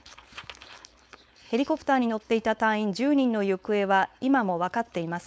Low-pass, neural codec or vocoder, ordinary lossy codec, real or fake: none; codec, 16 kHz, 4.8 kbps, FACodec; none; fake